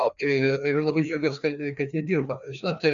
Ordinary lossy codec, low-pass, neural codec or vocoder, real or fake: MP3, 96 kbps; 7.2 kHz; codec, 16 kHz, 2 kbps, FreqCodec, larger model; fake